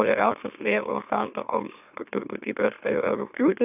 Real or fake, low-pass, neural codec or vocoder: fake; 3.6 kHz; autoencoder, 44.1 kHz, a latent of 192 numbers a frame, MeloTTS